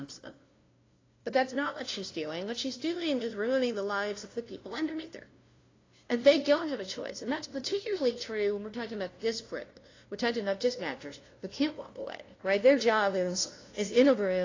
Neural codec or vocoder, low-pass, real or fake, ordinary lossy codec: codec, 16 kHz, 0.5 kbps, FunCodec, trained on LibriTTS, 25 frames a second; 7.2 kHz; fake; AAC, 32 kbps